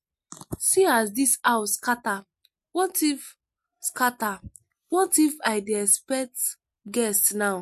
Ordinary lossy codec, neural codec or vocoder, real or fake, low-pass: MP3, 64 kbps; none; real; 14.4 kHz